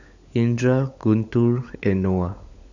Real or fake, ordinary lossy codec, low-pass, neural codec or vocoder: fake; none; 7.2 kHz; codec, 16 kHz, 16 kbps, FunCodec, trained on LibriTTS, 50 frames a second